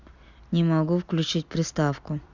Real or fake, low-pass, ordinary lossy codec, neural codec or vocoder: real; 7.2 kHz; Opus, 64 kbps; none